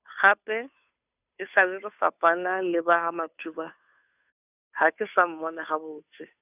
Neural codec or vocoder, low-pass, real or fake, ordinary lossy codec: codec, 16 kHz, 2 kbps, FunCodec, trained on Chinese and English, 25 frames a second; 3.6 kHz; fake; none